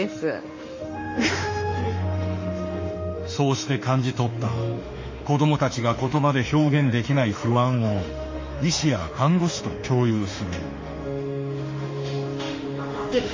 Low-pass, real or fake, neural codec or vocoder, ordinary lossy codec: 7.2 kHz; fake; autoencoder, 48 kHz, 32 numbers a frame, DAC-VAE, trained on Japanese speech; MP3, 32 kbps